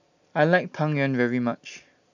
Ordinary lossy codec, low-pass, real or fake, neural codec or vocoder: none; 7.2 kHz; real; none